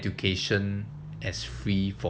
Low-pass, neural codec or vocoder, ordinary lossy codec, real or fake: none; none; none; real